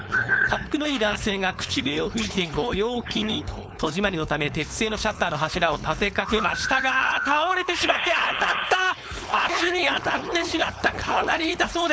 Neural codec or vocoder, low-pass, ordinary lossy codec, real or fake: codec, 16 kHz, 4.8 kbps, FACodec; none; none; fake